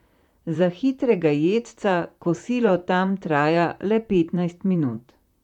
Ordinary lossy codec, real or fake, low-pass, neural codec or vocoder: none; fake; 19.8 kHz; vocoder, 44.1 kHz, 128 mel bands, Pupu-Vocoder